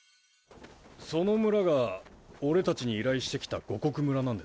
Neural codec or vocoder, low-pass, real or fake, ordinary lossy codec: none; none; real; none